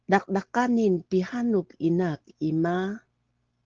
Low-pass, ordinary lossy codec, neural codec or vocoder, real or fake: 7.2 kHz; Opus, 16 kbps; none; real